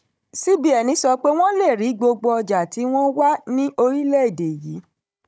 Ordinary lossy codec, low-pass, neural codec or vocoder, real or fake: none; none; codec, 16 kHz, 16 kbps, FunCodec, trained on Chinese and English, 50 frames a second; fake